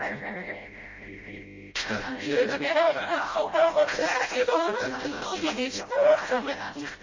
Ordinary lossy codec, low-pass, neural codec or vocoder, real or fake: MP3, 32 kbps; 7.2 kHz; codec, 16 kHz, 0.5 kbps, FreqCodec, smaller model; fake